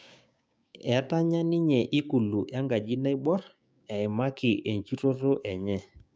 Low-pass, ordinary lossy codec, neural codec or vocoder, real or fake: none; none; codec, 16 kHz, 6 kbps, DAC; fake